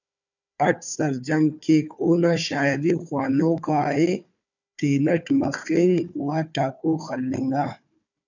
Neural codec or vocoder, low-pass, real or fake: codec, 16 kHz, 4 kbps, FunCodec, trained on Chinese and English, 50 frames a second; 7.2 kHz; fake